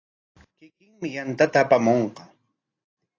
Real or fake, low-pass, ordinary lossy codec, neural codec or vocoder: real; 7.2 kHz; AAC, 32 kbps; none